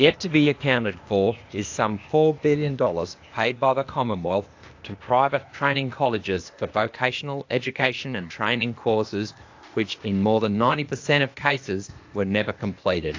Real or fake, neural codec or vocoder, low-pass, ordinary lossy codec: fake; codec, 16 kHz, 0.8 kbps, ZipCodec; 7.2 kHz; AAC, 48 kbps